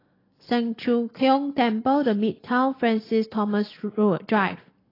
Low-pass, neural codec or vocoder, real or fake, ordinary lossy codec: 5.4 kHz; none; real; AAC, 24 kbps